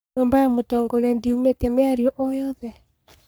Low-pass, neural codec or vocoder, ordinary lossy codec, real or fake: none; codec, 44.1 kHz, 3.4 kbps, Pupu-Codec; none; fake